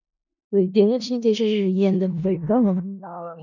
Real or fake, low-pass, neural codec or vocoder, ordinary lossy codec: fake; 7.2 kHz; codec, 16 kHz in and 24 kHz out, 0.4 kbps, LongCat-Audio-Codec, four codebook decoder; none